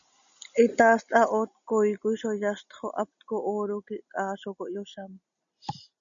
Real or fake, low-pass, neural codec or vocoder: real; 7.2 kHz; none